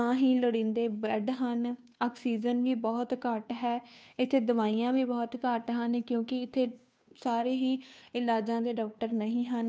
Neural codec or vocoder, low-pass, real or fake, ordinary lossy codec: codec, 16 kHz, 2 kbps, FunCodec, trained on Chinese and English, 25 frames a second; none; fake; none